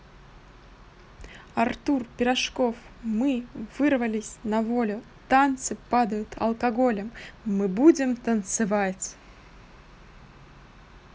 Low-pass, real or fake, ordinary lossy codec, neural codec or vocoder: none; real; none; none